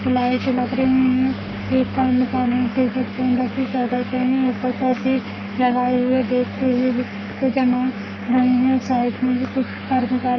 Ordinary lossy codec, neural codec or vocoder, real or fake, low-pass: none; codec, 44.1 kHz, 3.4 kbps, Pupu-Codec; fake; 7.2 kHz